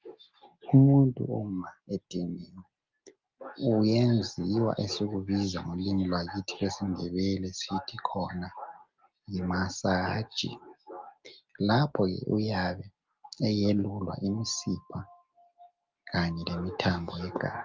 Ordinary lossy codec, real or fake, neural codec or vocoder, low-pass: Opus, 24 kbps; real; none; 7.2 kHz